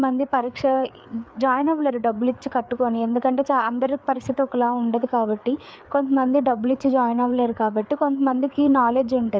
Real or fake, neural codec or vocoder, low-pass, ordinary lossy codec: fake; codec, 16 kHz, 4 kbps, FreqCodec, larger model; none; none